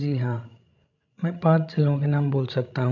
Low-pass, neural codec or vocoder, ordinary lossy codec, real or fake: 7.2 kHz; codec, 16 kHz, 16 kbps, FreqCodec, larger model; none; fake